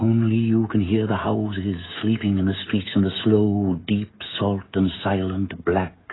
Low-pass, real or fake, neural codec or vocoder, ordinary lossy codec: 7.2 kHz; fake; codec, 16 kHz, 16 kbps, FreqCodec, smaller model; AAC, 16 kbps